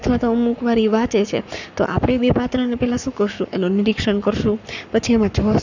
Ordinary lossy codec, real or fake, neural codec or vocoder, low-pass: none; fake; codec, 44.1 kHz, 7.8 kbps, Pupu-Codec; 7.2 kHz